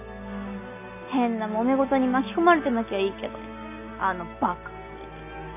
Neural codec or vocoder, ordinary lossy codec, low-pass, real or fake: none; none; 3.6 kHz; real